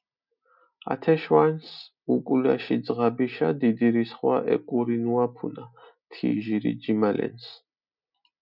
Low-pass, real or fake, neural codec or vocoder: 5.4 kHz; real; none